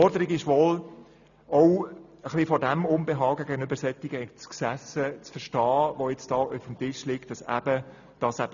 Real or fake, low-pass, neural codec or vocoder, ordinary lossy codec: real; 7.2 kHz; none; none